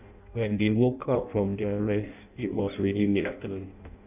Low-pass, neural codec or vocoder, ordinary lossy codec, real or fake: 3.6 kHz; codec, 16 kHz in and 24 kHz out, 0.6 kbps, FireRedTTS-2 codec; none; fake